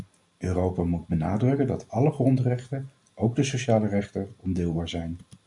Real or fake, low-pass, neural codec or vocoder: real; 10.8 kHz; none